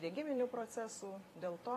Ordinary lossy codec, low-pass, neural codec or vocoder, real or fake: AAC, 48 kbps; 14.4 kHz; vocoder, 44.1 kHz, 128 mel bands every 256 samples, BigVGAN v2; fake